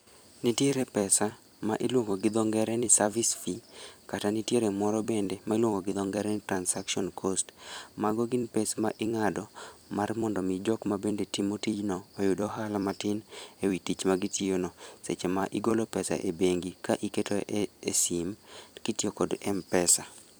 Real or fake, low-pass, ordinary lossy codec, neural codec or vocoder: fake; none; none; vocoder, 44.1 kHz, 128 mel bands every 256 samples, BigVGAN v2